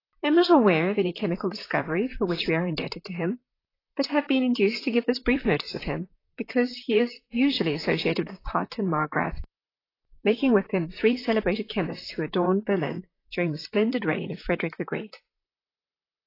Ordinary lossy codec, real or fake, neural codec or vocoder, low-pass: AAC, 24 kbps; fake; vocoder, 44.1 kHz, 128 mel bands, Pupu-Vocoder; 5.4 kHz